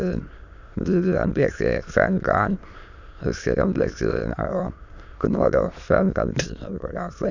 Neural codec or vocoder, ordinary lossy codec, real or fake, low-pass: autoencoder, 22.05 kHz, a latent of 192 numbers a frame, VITS, trained on many speakers; none; fake; 7.2 kHz